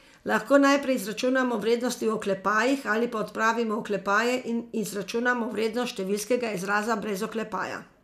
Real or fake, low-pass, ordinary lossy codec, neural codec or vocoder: real; 14.4 kHz; none; none